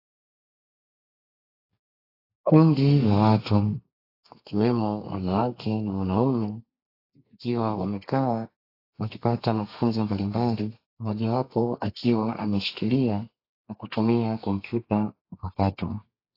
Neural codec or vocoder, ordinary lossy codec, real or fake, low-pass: codec, 32 kHz, 1.9 kbps, SNAC; AAC, 32 kbps; fake; 5.4 kHz